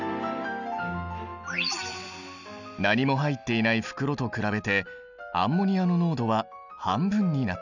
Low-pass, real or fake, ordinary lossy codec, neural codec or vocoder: 7.2 kHz; real; none; none